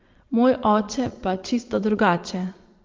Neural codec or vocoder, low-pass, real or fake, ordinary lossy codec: vocoder, 22.05 kHz, 80 mel bands, Vocos; 7.2 kHz; fake; Opus, 24 kbps